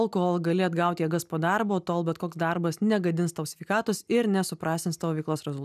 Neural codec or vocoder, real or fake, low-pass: none; real; 14.4 kHz